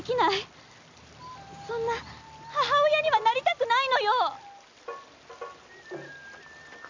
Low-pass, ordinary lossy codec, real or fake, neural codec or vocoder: 7.2 kHz; MP3, 64 kbps; real; none